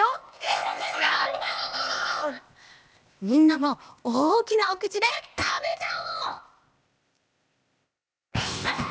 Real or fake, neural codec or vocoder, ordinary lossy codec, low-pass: fake; codec, 16 kHz, 0.8 kbps, ZipCodec; none; none